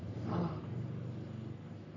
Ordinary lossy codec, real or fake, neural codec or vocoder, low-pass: none; fake; codec, 44.1 kHz, 3.4 kbps, Pupu-Codec; 7.2 kHz